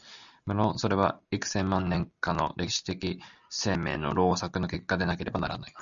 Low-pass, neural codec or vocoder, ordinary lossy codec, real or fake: 7.2 kHz; none; MP3, 96 kbps; real